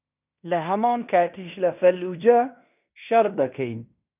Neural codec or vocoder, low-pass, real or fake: codec, 16 kHz in and 24 kHz out, 0.9 kbps, LongCat-Audio-Codec, fine tuned four codebook decoder; 3.6 kHz; fake